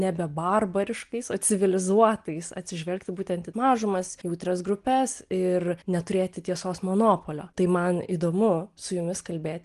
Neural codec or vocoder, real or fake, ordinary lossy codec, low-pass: none; real; Opus, 24 kbps; 10.8 kHz